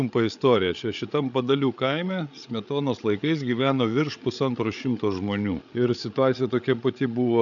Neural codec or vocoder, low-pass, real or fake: codec, 16 kHz, 8 kbps, FreqCodec, larger model; 7.2 kHz; fake